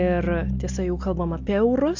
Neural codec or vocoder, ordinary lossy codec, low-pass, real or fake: none; MP3, 64 kbps; 7.2 kHz; real